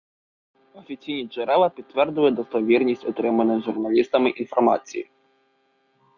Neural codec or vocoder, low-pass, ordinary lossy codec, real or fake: none; 7.2 kHz; Opus, 64 kbps; real